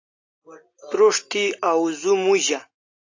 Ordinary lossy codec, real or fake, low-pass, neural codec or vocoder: AAC, 48 kbps; real; 7.2 kHz; none